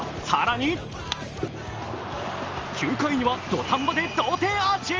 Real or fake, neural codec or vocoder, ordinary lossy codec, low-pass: real; none; Opus, 24 kbps; 7.2 kHz